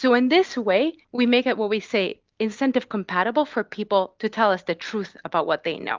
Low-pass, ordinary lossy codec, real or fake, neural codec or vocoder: 7.2 kHz; Opus, 24 kbps; real; none